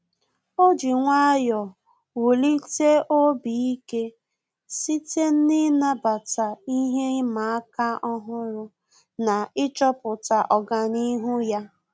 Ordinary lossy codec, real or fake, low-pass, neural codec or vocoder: none; real; none; none